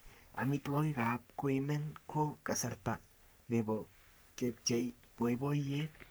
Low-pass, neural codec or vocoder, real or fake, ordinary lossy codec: none; codec, 44.1 kHz, 3.4 kbps, Pupu-Codec; fake; none